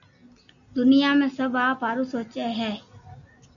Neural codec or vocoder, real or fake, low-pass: none; real; 7.2 kHz